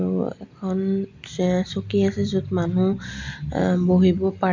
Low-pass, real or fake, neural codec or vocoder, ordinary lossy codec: 7.2 kHz; real; none; MP3, 64 kbps